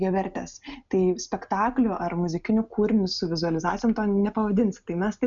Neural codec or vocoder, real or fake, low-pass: none; real; 7.2 kHz